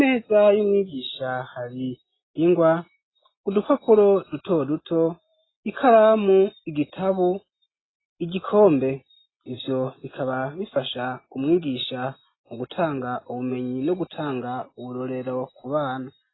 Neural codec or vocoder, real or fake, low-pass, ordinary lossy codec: none; real; 7.2 kHz; AAC, 16 kbps